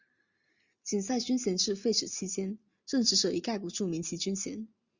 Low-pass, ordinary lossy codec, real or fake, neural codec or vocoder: 7.2 kHz; Opus, 64 kbps; real; none